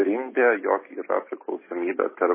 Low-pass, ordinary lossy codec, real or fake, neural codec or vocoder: 3.6 kHz; MP3, 16 kbps; real; none